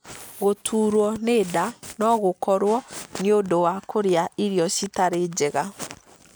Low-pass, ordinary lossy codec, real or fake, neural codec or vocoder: none; none; real; none